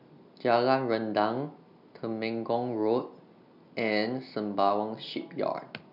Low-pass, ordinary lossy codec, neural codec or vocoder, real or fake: 5.4 kHz; none; none; real